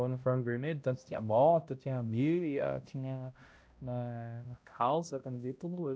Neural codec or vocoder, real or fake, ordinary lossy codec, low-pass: codec, 16 kHz, 0.5 kbps, X-Codec, HuBERT features, trained on balanced general audio; fake; none; none